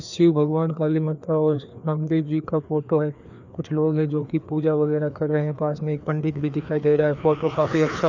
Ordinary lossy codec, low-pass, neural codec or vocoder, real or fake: none; 7.2 kHz; codec, 16 kHz, 2 kbps, FreqCodec, larger model; fake